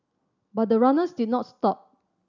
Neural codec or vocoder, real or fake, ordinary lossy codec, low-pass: none; real; none; 7.2 kHz